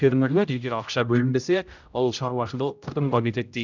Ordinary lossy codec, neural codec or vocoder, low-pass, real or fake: none; codec, 16 kHz, 0.5 kbps, X-Codec, HuBERT features, trained on general audio; 7.2 kHz; fake